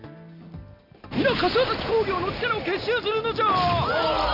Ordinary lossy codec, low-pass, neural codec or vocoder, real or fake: none; 5.4 kHz; none; real